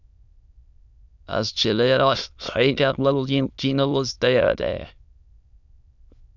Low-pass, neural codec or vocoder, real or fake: 7.2 kHz; autoencoder, 22.05 kHz, a latent of 192 numbers a frame, VITS, trained on many speakers; fake